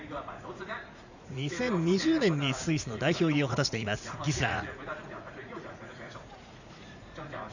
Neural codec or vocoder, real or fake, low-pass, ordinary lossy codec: vocoder, 44.1 kHz, 80 mel bands, Vocos; fake; 7.2 kHz; none